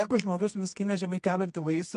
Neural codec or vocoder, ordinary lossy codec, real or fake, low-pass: codec, 24 kHz, 0.9 kbps, WavTokenizer, medium music audio release; MP3, 64 kbps; fake; 10.8 kHz